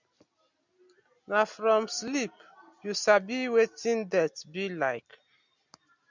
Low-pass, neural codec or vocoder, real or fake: 7.2 kHz; none; real